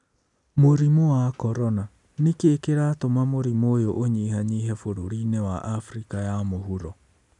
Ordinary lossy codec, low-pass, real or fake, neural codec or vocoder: none; 10.8 kHz; real; none